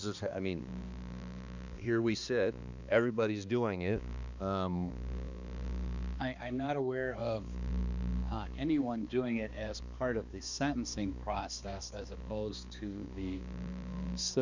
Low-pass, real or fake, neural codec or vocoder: 7.2 kHz; fake; codec, 16 kHz, 2 kbps, X-Codec, HuBERT features, trained on balanced general audio